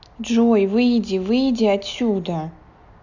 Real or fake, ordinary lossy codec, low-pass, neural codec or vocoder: real; none; 7.2 kHz; none